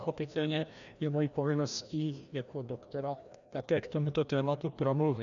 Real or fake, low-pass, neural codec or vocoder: fake; 7.2 kHz; codec, 16 kHz, 1 kbps, FreqCodec, larger model